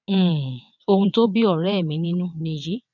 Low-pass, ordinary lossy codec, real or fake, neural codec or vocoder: 7.2 kHz; none; fake; vocoder, 22.05 kHz, 80 mel bands, WaveNeXt